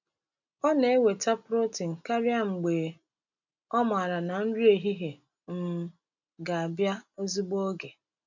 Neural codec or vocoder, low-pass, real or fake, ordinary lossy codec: none; 7.2 kHz; real; none